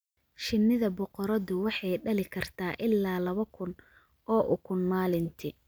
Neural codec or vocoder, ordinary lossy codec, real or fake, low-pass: none; none; real; none